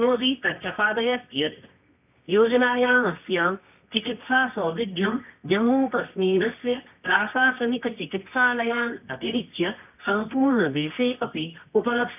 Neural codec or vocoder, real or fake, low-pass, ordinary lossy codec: codec, 24 kHz, 0.9 kbps, WavTokenizer, medium music audio release; fake; 3.6 kHz; none